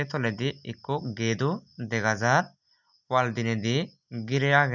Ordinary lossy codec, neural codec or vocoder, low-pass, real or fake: none; none; 7.2 kHz; real